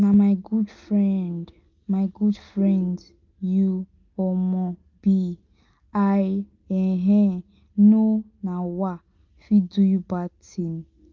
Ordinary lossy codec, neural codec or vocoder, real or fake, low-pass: Opus, 32 kbps; none; real; 7.2 kHz